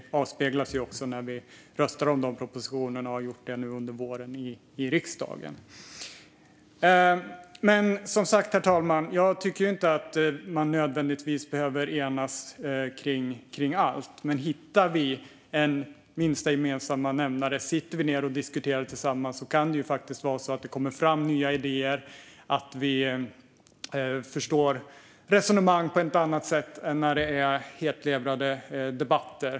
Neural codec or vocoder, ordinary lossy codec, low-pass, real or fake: none; none; none; real